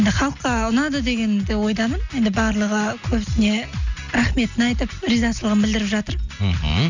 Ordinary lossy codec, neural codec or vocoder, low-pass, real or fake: none; none; 7.2 kHz; real